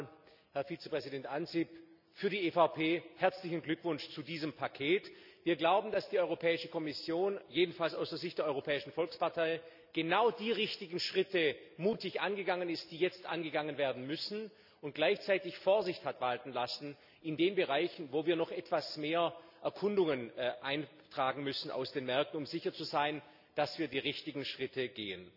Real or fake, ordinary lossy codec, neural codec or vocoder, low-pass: real; none; none; 5.4 kHz